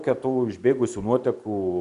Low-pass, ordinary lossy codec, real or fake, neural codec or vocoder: 10.8 kHz; Opus, 64 kbps; real; none